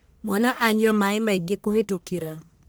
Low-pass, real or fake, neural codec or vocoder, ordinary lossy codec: none; fake; codec, 44.1 kHz, 1.7 kbps, Pupu-Codec; none